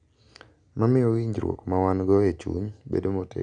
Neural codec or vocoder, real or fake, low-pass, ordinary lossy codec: none; real; 9.9 kHz; none